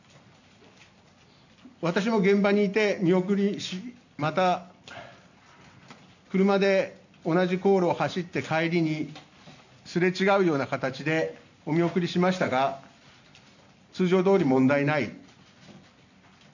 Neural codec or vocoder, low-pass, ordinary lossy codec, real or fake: none; 7.2 kHz; none; real